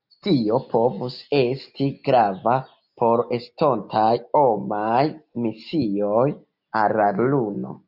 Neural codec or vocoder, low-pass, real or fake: none; 5.4 kHz; real